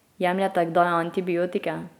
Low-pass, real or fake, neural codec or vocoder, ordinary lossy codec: 19.8 kHz; real; none; none